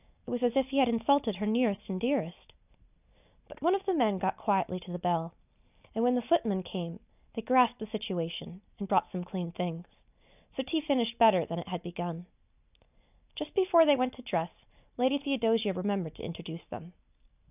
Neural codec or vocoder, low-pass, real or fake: none; 3.6 kHz; real